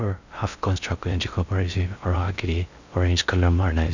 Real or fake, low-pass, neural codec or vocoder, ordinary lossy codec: fake; 7.2 kHz; codec, 16 kHz in and 24 kHz out, 0.6 kbps, FocalCodec, streaming, 2048 codes; none